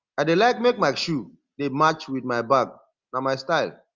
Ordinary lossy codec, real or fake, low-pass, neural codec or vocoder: Opus, 32 kbps; real; 7.2 kHz; none